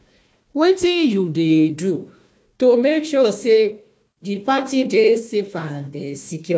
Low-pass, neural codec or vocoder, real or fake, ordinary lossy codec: none; codec, 16 kHz, 1 kbps, FunCodec, trained on Chinese and English, 50 frames a second; fake; none